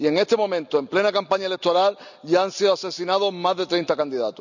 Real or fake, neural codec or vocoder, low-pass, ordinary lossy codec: real; none; 7.2 kHz; none